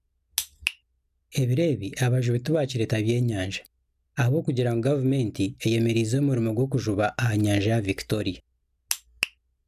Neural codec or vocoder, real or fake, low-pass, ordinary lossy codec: none; real; 14.4 kHz; none